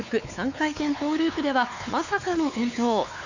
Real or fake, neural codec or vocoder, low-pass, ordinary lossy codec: fake; codec, 16 kHz, 4 kbps, X-Codec, WavLM features, trained on Multilingual LibriSpeech; 7.2 kHz; none